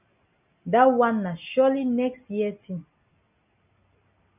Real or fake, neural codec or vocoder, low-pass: real; none; 3.6 kHz